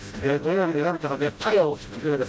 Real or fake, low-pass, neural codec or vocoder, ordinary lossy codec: fake; none; codec, 16 kHz, 0.5 kbps, FreqCodec, smaller model; none